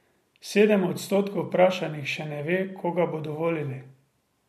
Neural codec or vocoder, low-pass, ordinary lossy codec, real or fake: none; 14.4 kHz; MP3, 64 kbps; real